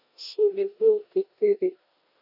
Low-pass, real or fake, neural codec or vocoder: 5.4 kHz; fake; codec, 16 kHz in and 24 kHz out, 0.9 kbps, LongCat-Audio-Codec, four codebook decoder